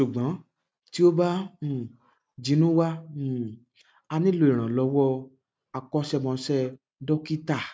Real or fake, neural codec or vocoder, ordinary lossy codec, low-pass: real; none; none; none